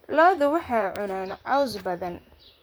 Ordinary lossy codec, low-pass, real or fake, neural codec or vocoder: none; none; fake; vocoder, 44.1 kHz, 128 mel bands, Pupu-Vocoder